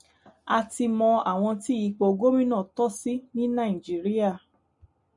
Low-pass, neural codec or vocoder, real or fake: 10.8 kHz; none; real